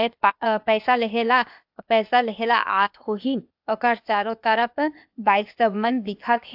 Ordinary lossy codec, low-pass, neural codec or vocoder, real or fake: AAC, 48 kbps; 5.4 kHz; codec, 16 kHz, 0.8 kbps, ZipCodec; fake